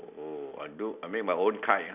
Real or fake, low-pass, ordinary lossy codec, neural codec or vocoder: real; 3.6 kHz; none; none